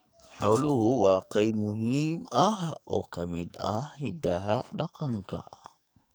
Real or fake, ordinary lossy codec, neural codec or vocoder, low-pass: fake; none; codec, 44.1 kHz, 2.6 kbps, SNAC; none